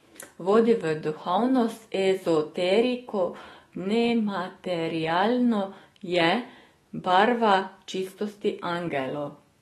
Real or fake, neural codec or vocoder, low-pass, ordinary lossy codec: fake; autoencoder, 48 kHz, 128 numbers a frame, DAC-VAE, trained on Japanese speech; 19.8 kHz; AAC, 32 kbps